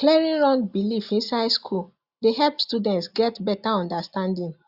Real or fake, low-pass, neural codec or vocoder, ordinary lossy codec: real; 5.4 kHz; none; Opus, 64 kbps